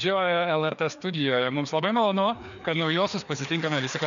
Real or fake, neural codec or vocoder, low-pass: fake; codec, 16 kHz, 2 kbps, FreqCodec, larger model; 7.2 kHz